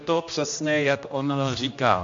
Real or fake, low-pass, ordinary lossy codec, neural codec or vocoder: fake; 7.2 kHz; MP3, 48 kbps; codec, 16 kHz, 1 kbps, X-Codec, HuBERT features, trained on general audio